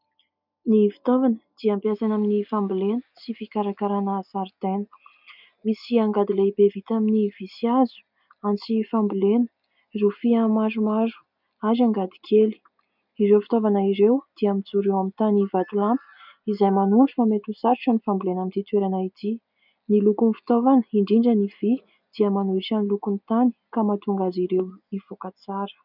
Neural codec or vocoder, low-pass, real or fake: none; 5.4 kHz; real